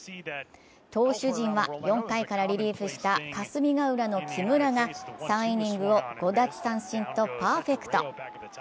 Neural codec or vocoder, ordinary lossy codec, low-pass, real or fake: none; none; none; real